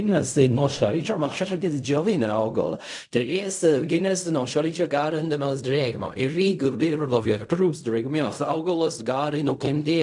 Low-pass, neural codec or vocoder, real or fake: 10.8 kHz; codec, 16 kHz in and 24 kHz out, 0.4 kbps, LongCat-Audio-Codec, fine tuned four codebook decoder; fake